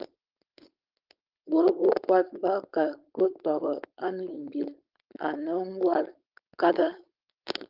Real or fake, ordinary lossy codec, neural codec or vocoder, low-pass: fake; Opus, 32 kbps; codec, 16 kHz, 4.8 kbps, FACodec; 5.4 kHz